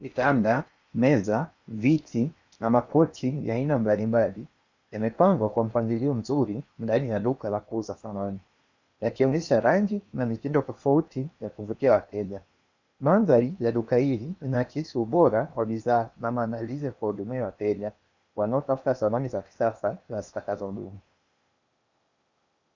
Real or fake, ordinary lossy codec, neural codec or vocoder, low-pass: fake; Opus, 64 kbps; codec, 16 kHz in and 24 kHz out, 0.8 kbps, FocalCodec, streaming, 65536 codes; 7.2 kHz